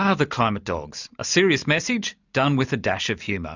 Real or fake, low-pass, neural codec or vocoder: real; 7.2 kHz; none